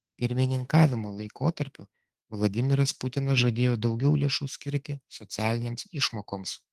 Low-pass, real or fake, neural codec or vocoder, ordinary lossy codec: 14.4 kHz; fake; autoencoder, 48 kHz, 32 numbers a frame, DAC-VAE, trained on Japanese speech; Opus, 24 kbps